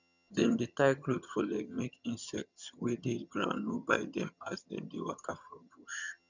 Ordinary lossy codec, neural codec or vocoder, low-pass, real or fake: none; vocoder, 22.05 kHz, 80 mel bands, HiFi-GAN; 7.2 kHz; fake